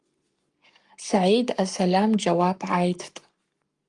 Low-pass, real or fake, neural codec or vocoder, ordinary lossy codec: 10.8 kHz; fake; codec, 44.1 kHz, 7.8 kbps, Pupu-Codec; Opus, 32 kbps